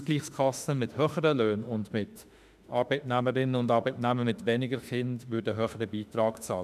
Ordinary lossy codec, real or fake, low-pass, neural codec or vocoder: none; fake; 14.4 kHz; autoencoder, 48 kHz, 32 numbers a frame, DAC-VAE, trained on Japanese speech